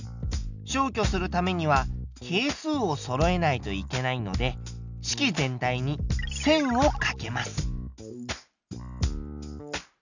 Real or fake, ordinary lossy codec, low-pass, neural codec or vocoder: real; none; 7.2 kHz; none